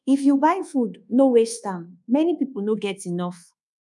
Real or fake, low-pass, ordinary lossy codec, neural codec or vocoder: fake; none; none; codec, 24 kHz, 1.2 kbps, DualCodec